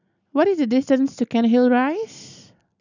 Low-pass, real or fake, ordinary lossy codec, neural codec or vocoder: 7.2 kHz; real; none; none